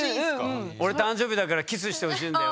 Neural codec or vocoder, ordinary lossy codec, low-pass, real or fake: none; none; none; real